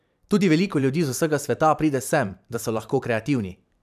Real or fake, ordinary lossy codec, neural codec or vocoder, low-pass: fake; none; vocoder, 44.1 kHz, 128 mel bands every 512 samples, BigVGAN v2; 14.4 kHz